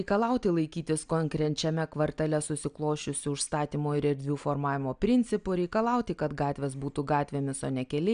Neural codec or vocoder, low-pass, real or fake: none; 9.9 kHz; real